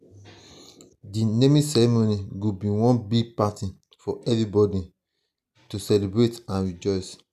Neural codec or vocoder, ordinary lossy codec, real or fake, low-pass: none; none; real; 14.4 kHz